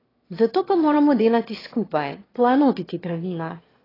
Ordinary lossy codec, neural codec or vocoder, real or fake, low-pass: AAC, 24 kbps; autoencoder, 22.05 kHz, a latent of 192 numbers a frame, VITS, trained on one speaker; fake; 5.4 kHz